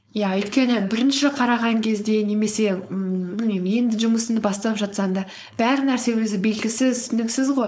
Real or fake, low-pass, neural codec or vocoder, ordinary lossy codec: fake; none; codec, 16 kHz, 4.8 kbps, FACodec; none